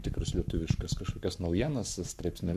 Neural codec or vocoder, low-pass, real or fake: codec, 44.1 kHz, 7.8 kbps, Pupu-Codec; 14.4 kHz; fake